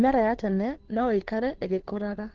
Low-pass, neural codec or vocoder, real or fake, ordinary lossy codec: 7.2 kHz; codec, 16 kHz, 2 kbps, FunCodec, trained on Chinese and English, 25 frames a second; fake; Opus, 16 kbps